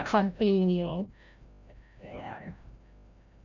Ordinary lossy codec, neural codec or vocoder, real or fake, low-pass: none; codec, 16 kHz, 0.5 kbps, FreqCodec, larger model; fake; 7.2 kHz